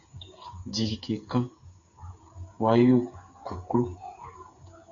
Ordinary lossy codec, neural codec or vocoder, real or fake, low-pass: Opus, 64 kbps; codec, 16 kHz, 8 kbps, FreqCodec, smaller model; fake; 7.2 kHz